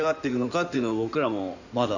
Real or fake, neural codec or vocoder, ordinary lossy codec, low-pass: fake; codec, 16 kHz in and 24 kHz out, 2.2 kbps, FireRedTTS-2 codec; none; 7.2 kHz